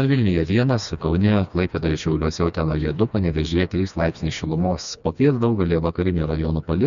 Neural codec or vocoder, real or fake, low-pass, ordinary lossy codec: codec, 16 kHz, 2 kbps, FreqCodec, smaller model; fake; 7.2 kHz; MP3, 96 kbps